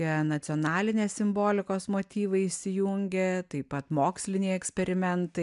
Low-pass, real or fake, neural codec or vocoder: 10.8 kHz; real; none